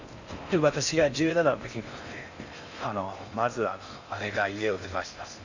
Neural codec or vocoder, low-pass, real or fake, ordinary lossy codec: codec, 16 kHz in and 24 kHz out, 0.6 kbps, FocalCodec, streaming, 4096 codes; 7.2 kHz; fake; Opus, 64 kbps